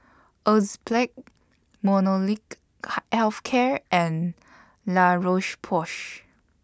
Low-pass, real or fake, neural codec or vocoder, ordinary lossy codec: none; real; none; none